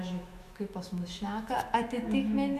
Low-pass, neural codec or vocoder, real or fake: 14.4 kHz; autoencoder, 48 kHz, 128 numbers a frame, DAC-VAE, trained on Japanese speech; fake